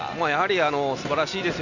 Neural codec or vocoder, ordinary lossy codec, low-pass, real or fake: none; none; 7.2 kHz; real